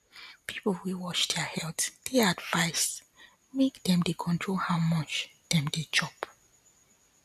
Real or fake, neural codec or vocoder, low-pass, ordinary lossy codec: real; none; 14.4 kHz; none